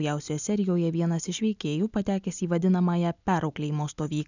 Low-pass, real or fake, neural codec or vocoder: 7.2 kHz; real; none